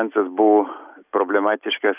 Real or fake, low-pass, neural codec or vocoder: real; 3.6 kHz; none